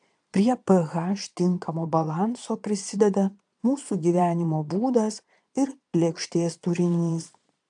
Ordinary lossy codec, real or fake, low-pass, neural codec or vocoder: AAC, 64 kbps; fake; 9.9 kHz; vocoder, 22.05 kHz, 80 mel bands, Vocos